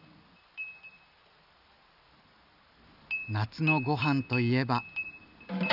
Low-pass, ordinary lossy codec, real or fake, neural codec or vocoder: 5.4 kHz; none; real; none